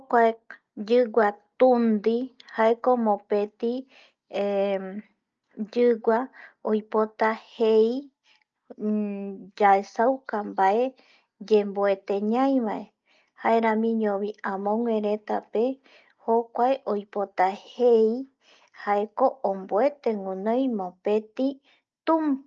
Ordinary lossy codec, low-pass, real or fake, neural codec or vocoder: Opus, 24 kbps; 7.2 kHz; real; none